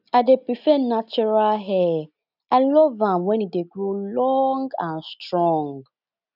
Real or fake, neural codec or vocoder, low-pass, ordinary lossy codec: real; none; 5.4 kHz; none